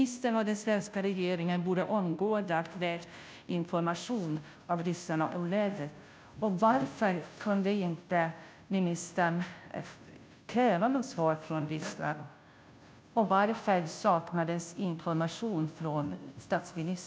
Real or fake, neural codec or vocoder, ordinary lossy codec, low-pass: fake; codec, 16 kHz, 0.5 kbps, FunCodec, trained on Chinese and English, 25 frames a second; none; none